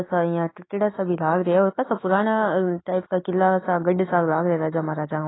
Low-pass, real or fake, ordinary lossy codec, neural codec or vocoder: 7.2 kHz; fake; AAC, 16 kbps; autoencoder, 48 kHz, 128 numbers a frame, DAC-VAE, trained on Japanese speech